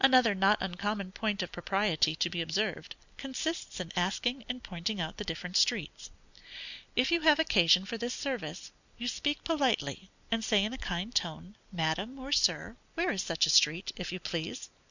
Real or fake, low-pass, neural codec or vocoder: real; 7.2 kHz; none